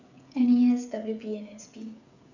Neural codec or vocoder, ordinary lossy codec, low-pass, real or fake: codec, 16 kHz, 8 kbps, FreqCodec, smaller model; none; 7.2 kHz; fake